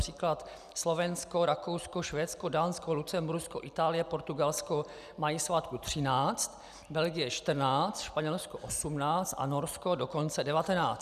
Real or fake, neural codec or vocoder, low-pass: real; none; 14.4 kHz